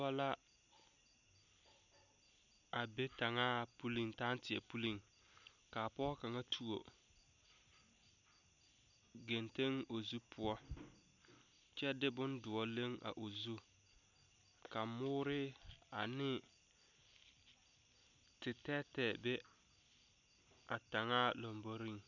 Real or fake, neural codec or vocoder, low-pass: real; none; 7.2 kHz